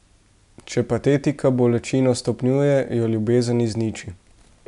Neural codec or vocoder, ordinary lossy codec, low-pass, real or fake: none; none; 10.8 kHz; real